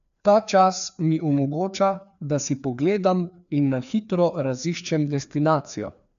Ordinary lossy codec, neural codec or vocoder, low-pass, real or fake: none; codec, 16 kHz, 2 kbps, FreqCodec, larger model; 7.2 kHz; fake